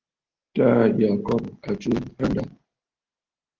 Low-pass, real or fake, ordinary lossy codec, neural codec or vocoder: 7.2 kHz; real; Opus, 16 kbps; none